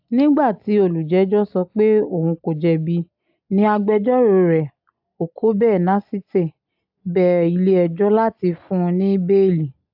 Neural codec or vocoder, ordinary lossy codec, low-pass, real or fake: none; AAC, 48 kbps; 5.4 kHz; real